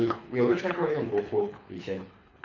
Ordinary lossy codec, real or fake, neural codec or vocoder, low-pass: none; fake; codec, 24 kHz, 3 kbps, HILCodec; 7.2 kHz